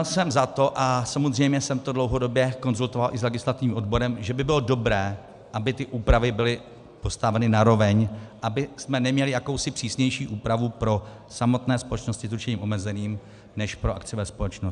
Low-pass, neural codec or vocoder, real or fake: 10.8 kHz; none; real